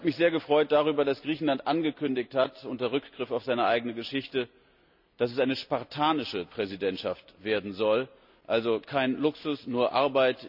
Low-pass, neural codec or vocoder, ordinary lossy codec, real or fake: 5.4 kHz; none; none; real